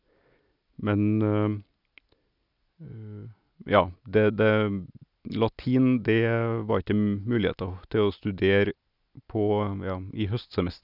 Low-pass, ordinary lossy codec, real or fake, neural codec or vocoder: 5.4 kHz; none; real; none